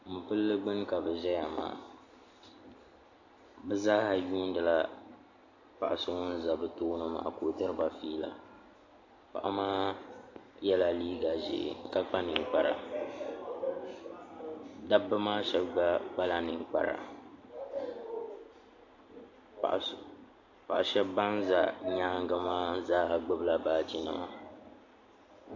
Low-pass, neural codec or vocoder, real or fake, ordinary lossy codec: 7.2 kHz; none; real; AAC, 48 kbps